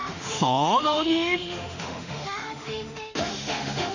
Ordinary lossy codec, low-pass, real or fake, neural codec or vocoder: none; 7.2 kHz; fake; autoencoder, 48 kHz, 32 numbers a frame, DAC-VAE, trained on Japanese speech